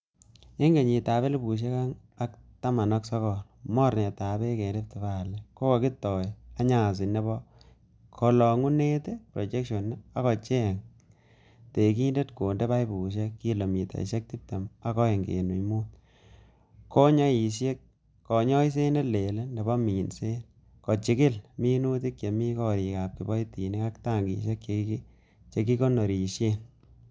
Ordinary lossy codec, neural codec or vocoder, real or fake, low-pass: none; none; real; none